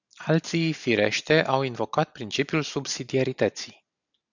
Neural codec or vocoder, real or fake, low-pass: none; real; 7.2 kHz